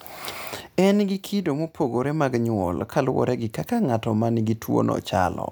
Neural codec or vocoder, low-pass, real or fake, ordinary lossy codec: none; none; real; none